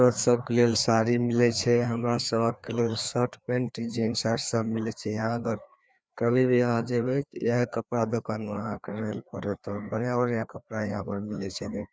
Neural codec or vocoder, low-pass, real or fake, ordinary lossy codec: codec, 16 kHz, 2 kbps, FreqCodec, larger model; none; fake; none